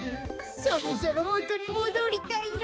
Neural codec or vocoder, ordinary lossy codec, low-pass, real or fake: codec, 16 kHz, 2 kbps, X-Codec, HuBERT features, trained on balanced general audio; none; none; fake